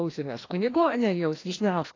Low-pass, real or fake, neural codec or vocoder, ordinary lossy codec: 7.2 kHz; fake; codec, 16 kHz, 1 kbps, FreqCodec, larger model; AAC, 32 kbps